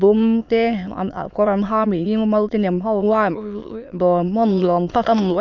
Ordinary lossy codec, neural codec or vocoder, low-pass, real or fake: none; autoencoder, 22.05 kHz, a latent of 192 numbers a frame, VITS, trained on many speakers; 7.2 kHz; fake